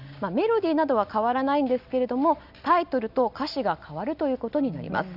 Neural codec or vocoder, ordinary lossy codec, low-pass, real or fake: none; none; 5.4 kHz; real